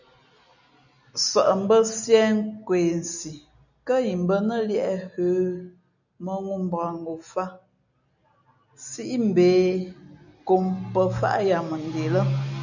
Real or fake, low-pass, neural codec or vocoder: real; 7.2 kHz; none